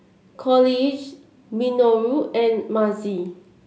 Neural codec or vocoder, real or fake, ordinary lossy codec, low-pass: none; real; none; none